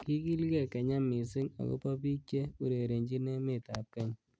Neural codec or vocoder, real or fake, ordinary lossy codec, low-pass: none; real; none; none